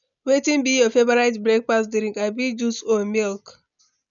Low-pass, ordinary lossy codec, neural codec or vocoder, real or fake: 7.2 kHz; none; none; real